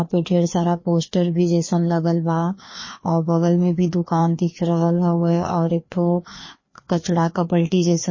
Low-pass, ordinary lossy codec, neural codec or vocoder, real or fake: 7.2 kHz; MP3, 32 kbps; codec, 16 kHz, 2 kbps, FreqCodec, larger model; fake